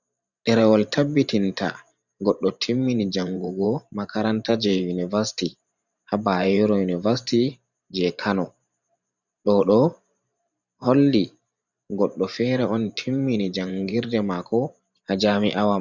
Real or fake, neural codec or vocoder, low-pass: fake; vocoder, 44.1 kHz, 128 mel bands every 512 samples, BigVGAN v2; 7.2 kHz